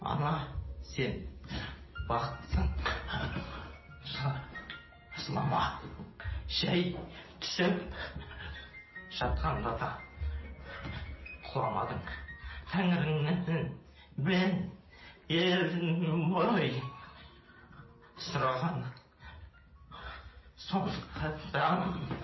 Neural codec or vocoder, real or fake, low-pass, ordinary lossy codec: none; real; 7.2 kHz; MP3, 24 kbps